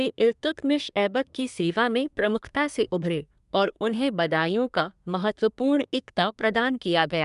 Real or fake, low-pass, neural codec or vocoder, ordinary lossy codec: fake; 10.8 kHz; codec, 24 kHz, 1 kbps, SNAC; none